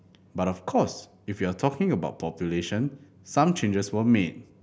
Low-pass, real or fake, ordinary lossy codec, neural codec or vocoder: none; real; none; none